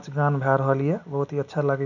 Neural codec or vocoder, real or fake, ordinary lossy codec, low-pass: none; real; none; 7.2 kHz